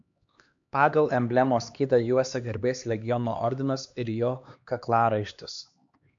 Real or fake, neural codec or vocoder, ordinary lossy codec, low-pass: fake; codec, 16 kHz, 2 kbps, X-Codec, HuBERT features, trained on LibriSpeech; AAC, 64 kbps; 7.2 kHz